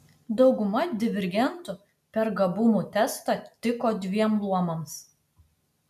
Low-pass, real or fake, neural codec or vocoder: 14.4 kHz; real; none